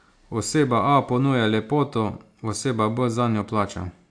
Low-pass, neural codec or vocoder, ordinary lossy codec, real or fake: 9.9 kHz; none; AAC, 64 kbps; real